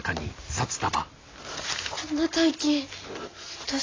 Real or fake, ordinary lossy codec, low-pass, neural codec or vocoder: real; AAC, 32 kbps; 7.2 kHz; none